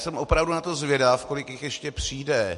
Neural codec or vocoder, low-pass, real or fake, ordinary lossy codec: none; 14.4 kHz; real; MP3, 48 kbps